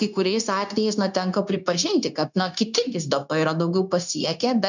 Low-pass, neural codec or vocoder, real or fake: 7.2 kHz; codec, 16 kHz, 0.9 kbps, LongCat-Audio-Codec; fake